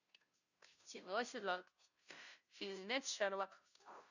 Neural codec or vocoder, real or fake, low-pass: codec, 16 kHz, 0.5 kbps, FunCodec, trained on Chinese and English, 25 frames a second; fake; 7.2 kHz